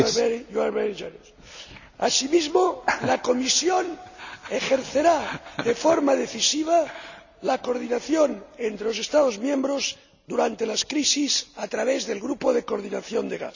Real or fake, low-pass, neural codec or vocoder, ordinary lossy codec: real; 7.2 kHz; none; none